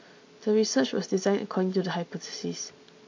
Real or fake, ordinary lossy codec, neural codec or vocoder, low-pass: real; MP3, 48 kbps; none; 7.2 kHz